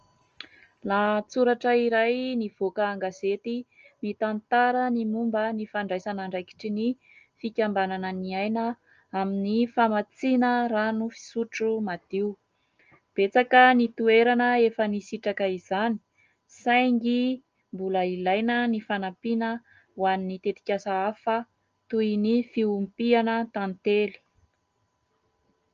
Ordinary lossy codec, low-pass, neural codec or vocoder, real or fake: Opus, 24 kbps; 7.2 kHz; none; real